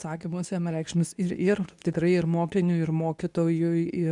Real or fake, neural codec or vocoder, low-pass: fake; codec, 24 kHz, 0.9 kbps, WavTokenizer, medium speech release version 2; 10.8 kHz